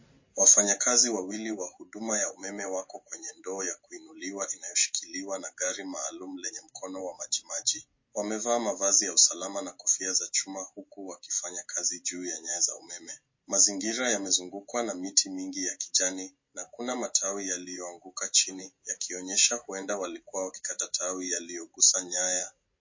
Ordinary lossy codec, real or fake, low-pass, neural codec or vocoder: MP3, 32 kbps; real; 7.2 kHz; none